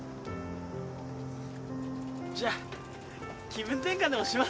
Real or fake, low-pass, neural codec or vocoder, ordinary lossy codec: real; none; none; none